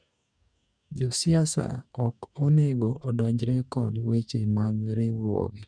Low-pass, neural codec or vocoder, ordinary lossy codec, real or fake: 9.9 kHz; codec, 44.1 kHz, 2.6 kbps, DAC; none; fake